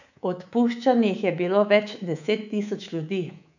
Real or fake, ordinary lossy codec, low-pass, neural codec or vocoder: fake; none; 7.2 kHz; codec, 24 kHz, 3.1 kbps, DualCodec